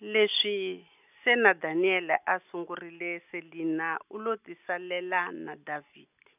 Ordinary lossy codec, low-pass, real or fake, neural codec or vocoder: none; 3.6 kHz; real; none